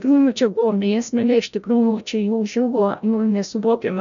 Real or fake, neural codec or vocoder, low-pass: fake; codec, 16 kHz, 0.5 kbps, FreqCodec, larger model; 7.2 kHz